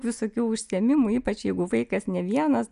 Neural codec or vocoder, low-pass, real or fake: none; 10.8 kHz; real